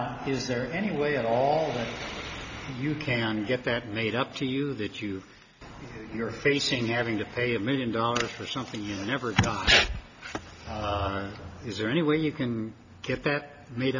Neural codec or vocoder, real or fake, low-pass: none; real; 7.2 kHz